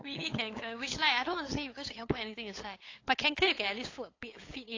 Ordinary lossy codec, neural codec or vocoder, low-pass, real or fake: AAC, 32 kbps; codec, 16 kHz, 8 kbps, FunCodec, trained on LibriTTS, 25 frames a second; 7.2 kHz; fake